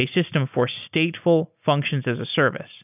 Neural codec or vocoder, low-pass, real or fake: none; 3.6 kHz; real